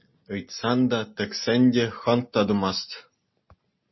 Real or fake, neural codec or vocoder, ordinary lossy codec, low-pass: real; none; MP3, 24 kbps; 7.2 kHz